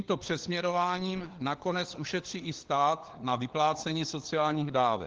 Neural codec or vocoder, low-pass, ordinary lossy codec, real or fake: codec, 16 kHz, 4 kbps, FunCodec, trained on LibriTTS, 50 frames a second; 7.2 kHz; Opus, 16 kbps; fake